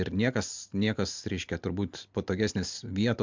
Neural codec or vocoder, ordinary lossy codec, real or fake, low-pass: none; MP3, 64 kbps; real; 7.2 kHz